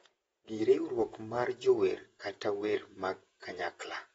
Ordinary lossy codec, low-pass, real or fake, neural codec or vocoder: AAC, 24 kbps; 19.8 kHz; fake; vocoder, 48 kHz, 128 mel bands, Vocos